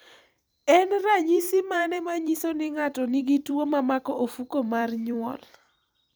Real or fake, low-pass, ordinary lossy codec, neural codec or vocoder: fake; none; none; vocoder, 44.1 kHz, 128 mel bands every 256 samples, BigVGAN v2